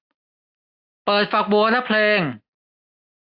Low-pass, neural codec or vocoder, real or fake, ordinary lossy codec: 5.4 kHz; none; real; AAC, 48 kbps